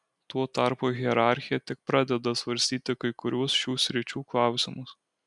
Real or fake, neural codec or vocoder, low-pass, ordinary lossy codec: real; none; 10.8 kHz; MP3, 96 kbps